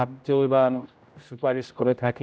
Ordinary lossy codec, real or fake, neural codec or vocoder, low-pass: none; fake; codec, 16 kHz, 0.5 kbps, X-Codec, HuBERT features, trained on general audio; none